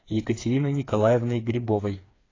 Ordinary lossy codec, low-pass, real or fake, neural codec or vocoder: AAC, 32 kbps; 7.2 kHz; fake; codec, 16 kHz, 4 kbps, FreqCodec, smaller model